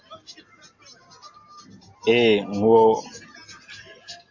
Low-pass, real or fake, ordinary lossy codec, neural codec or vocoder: 7.2 kHz; real; AAC, 48 kbps; none